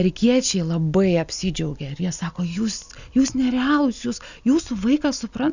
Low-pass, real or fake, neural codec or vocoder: 7.2 kHz; real; none